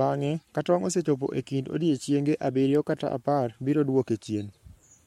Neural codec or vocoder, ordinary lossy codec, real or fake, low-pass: codec, 44.1 kHz, 7.8 kbps, Pupu-Codec; MP3, 64 kbps; fake; 19.8 kHz